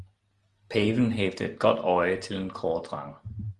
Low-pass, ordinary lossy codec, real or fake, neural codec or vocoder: 10.8 kHz; Opus, 24 kbps; real; none